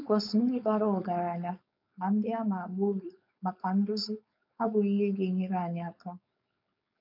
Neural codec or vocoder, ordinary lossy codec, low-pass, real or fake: codec, 16 kHz, 4.8 kbps, FACodec; none; 5.4 kHz; fake